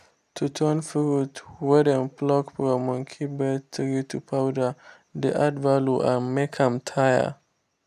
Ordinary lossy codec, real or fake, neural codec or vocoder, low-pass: none; real; none; 14.4 kHz